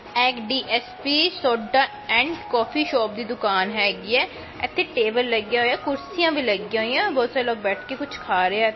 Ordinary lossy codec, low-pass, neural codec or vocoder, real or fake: MP3, 24 kbps; 7.2 kHz; none; real